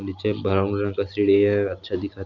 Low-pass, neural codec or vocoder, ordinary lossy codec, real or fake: 7.2 kHz; none; none; real